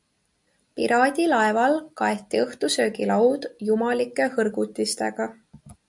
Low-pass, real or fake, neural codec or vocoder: 10.8 kHz; real; none